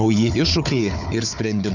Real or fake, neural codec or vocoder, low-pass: fake; codec, 16 kHz, 4 kbps, FunCodec, trained on Chinese and English, 50 frames a second; 7.2 kHz